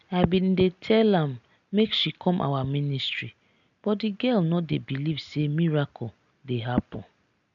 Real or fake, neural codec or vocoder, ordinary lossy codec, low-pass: real; none; none; 7.2 kHz